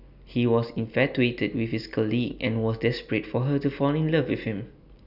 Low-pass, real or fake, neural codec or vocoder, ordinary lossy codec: 5.4 kHz; real; none; none